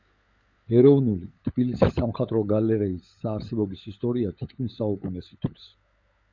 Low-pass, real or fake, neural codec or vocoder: 7.2 kHz; fake; codec, 16 kHz, 16 kbps, FunCodec, trained on LibriTTS, 50 frames a second